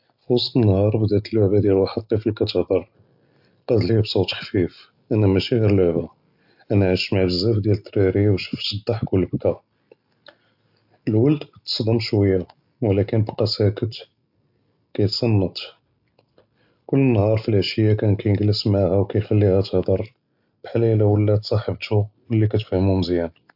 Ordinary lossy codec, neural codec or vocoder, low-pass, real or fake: AAC, 48 kbps; none; 5.4 kHz; real